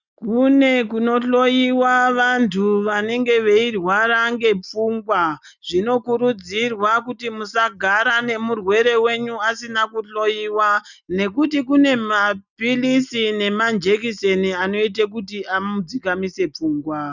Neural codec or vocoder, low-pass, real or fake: none; 7.2 kHz; real